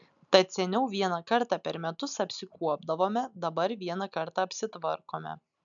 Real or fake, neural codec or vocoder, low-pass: real; none; 7.2 kHz